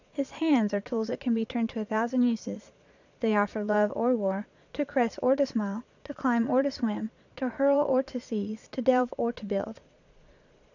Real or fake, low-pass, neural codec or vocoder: fake; 7.2 kHz; vocoder, 22.05 kHz, 80 mel bands, Vocos